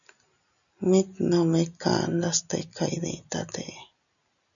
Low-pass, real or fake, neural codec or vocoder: 7.2 kHz; real; none